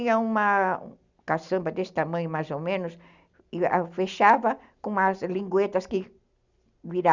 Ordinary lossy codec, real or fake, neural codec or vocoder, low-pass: Opus, 64 kbps; real; none; 7.2 kHz